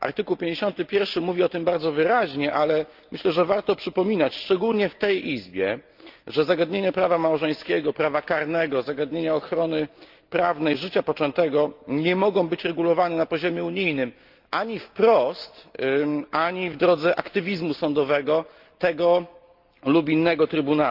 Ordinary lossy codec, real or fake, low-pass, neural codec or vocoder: Opus, 24 kbps; real; 5.4 kHz; none